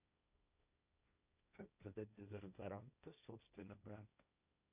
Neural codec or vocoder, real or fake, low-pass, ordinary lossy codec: codec, 16 kHz, 1.1 kbps, Voila-Tokenizer; fake; 3.6 kHz; none